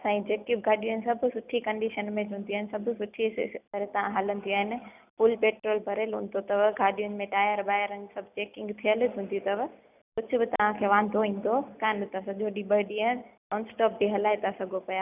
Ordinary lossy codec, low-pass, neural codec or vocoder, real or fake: none; 3.6 kHz; none; real